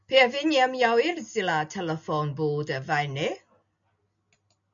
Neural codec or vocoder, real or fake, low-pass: none; real; 7.2 kHz